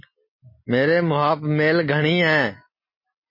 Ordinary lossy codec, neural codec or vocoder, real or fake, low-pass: MP3, 24 kbps; none; real; 5.4 kHz